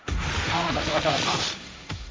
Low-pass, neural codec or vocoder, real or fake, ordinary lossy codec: none; codec, 16 kHz, 1.1 kbps, Voila-Tokenizer; fake; none